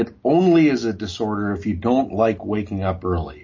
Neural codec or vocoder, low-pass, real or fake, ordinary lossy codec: none; 7.2 kHz; real; MP3, 32 kbps